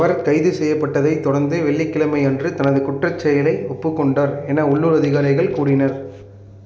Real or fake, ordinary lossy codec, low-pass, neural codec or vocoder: real; none; none; none